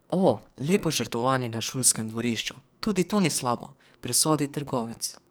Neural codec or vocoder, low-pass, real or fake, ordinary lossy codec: codec, 44.1 kHz, 2.6 kbps, SNAC; none; fake; none